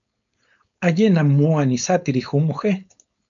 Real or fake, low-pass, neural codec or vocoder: fake; 7.2 kHz; codec, 16 kHz, 4.8 kbps, FACodec